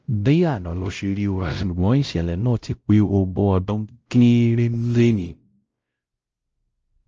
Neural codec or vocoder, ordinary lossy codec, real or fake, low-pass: codec, 16 kHz, 0.5 kbps, X-Codec, WavLM features, trained on Multilingual LibriSpeech; Opus, 24 kbps; fake; 7.2 kHz